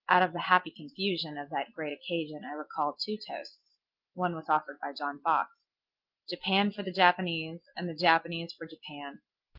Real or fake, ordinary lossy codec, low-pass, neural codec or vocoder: real; Opus, 32 kbps; 5.4 kHz; none